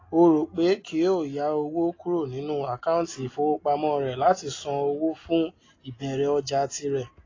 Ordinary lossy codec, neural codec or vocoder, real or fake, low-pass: AAC, 32 kbps; none; real; 7.2 kHz